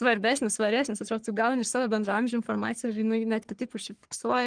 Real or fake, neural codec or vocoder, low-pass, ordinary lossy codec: fake; codec, 44.1 kHz, 3.4 kbps, Pupu-Codec; 9.9 kHz; Opus, 24 kbps